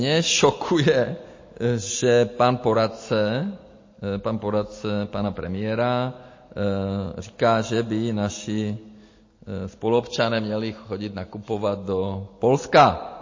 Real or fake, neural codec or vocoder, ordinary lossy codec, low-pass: real; none; MP3, 32 kbps; 7.2 kHz